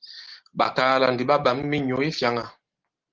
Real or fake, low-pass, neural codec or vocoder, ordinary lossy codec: real; 7.2 kHz; none; Opus, 16 kbps